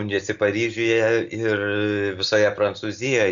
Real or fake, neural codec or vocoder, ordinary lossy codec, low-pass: real; none; Opus, 64 kbps; 7.2 kHz